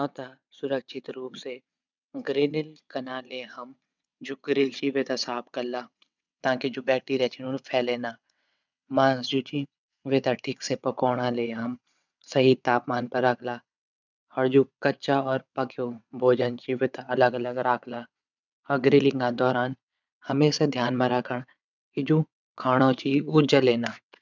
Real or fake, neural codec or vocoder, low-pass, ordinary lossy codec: fake; vocoder, 22.05 kHz, 80 mel bands, WaveNeXt; 7.2 kHz; none